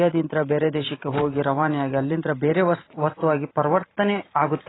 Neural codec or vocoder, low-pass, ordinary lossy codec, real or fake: vocoder, 44.1 kHz, 128 mel bands every 512 samples, BigVGAN v2; 7.2 kHz; AAC, 16 kbps; fake